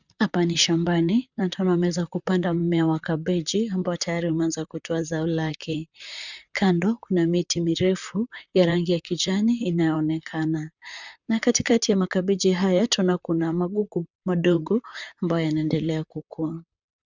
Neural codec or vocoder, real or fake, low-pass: vocoder, 44.1 kHz, 128 mel bands, Pupu-Vocoder; fake; 7.2 kHz